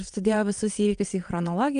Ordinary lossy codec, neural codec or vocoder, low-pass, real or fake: Opus, 32 kbps; vocoder, 22.05 kHz, 80 mel bands, WaveNeXt; 9.9 kHz; fake